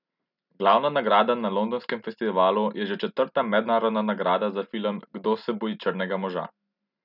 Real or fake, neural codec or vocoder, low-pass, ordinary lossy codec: real; none; 5.4 kHz; none